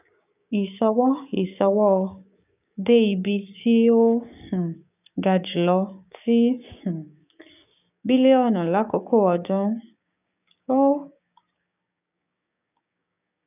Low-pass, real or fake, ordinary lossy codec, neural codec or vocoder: 3.6 kHz; fake; none; codec, 16 kHz, 6 kbps, DAC